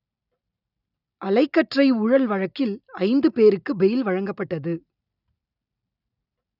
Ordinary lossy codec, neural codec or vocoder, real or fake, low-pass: none; none; real; 5.4 kHz